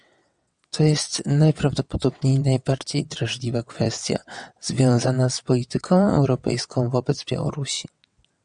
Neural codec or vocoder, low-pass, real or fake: vocoder, 22.05 kHz, 80 mel bands, WaveNeXt; 9.9 kHz; fake